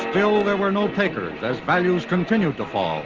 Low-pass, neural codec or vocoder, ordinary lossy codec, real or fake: 7.2 kHz; none; Opus, 16 kbps; real